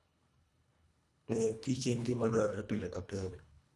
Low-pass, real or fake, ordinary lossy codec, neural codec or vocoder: none; fake; none; codec, 24 kHz, 1.5 kbps, HILCodec